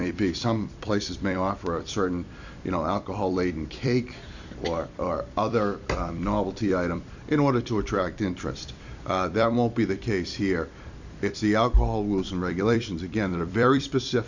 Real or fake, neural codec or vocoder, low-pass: fake; vocoder, 44.1 kHz, 128 mel bands every 256 samples, BigVGAN v2; 7.2 kHz